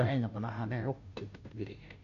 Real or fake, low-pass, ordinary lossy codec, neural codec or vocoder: fake; 7.2 kHz; none; codec, 16 kHz, 0.5 kbps, FunCodec, trained on Chinese and English, 25 frames a second